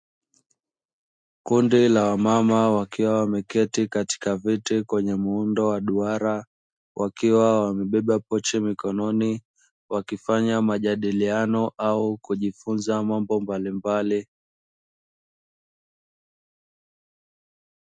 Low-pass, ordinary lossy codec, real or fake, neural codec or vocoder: 9.9 kHz; MP3, 64 kbps; real; none